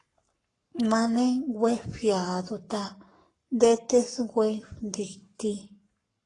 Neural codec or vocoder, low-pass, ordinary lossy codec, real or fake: codec, 44.1 kHz, 7.8 kbps, Pupu-Codec; 10.8 kHz; AAC, 32 kbps; fake